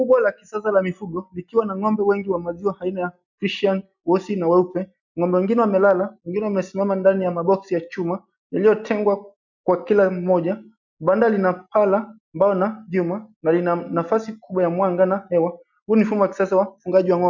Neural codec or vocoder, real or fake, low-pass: none; real; 7.2 kHz